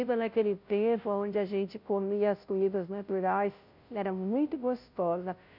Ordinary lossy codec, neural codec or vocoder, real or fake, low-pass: none; codec, 16 kHz, 0.5 kbps, FunCodec, trained on Chinese and English, 25 frames a second; fake; 5.4 kHz